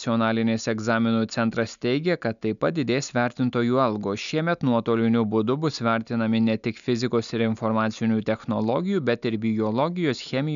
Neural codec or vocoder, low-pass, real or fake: none; 7.2 kHz; real